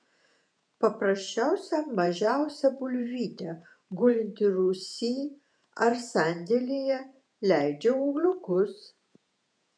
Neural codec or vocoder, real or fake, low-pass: vocoder, 48 kHz, 128 mel bands, Vocos; fake; 9.9 kHz